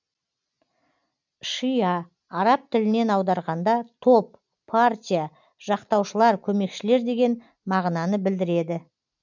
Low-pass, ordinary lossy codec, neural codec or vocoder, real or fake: 7.2 kHz; none; none; real